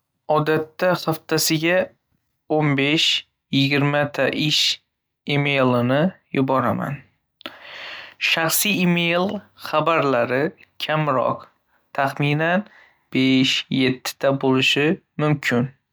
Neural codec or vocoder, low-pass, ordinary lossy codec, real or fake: none; none; none; real